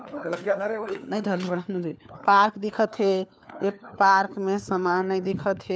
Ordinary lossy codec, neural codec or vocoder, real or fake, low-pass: none; codec, 16 kHz, 4 kbps, FunCodec, trained on LibriTTS, 50 frames a second; fake; none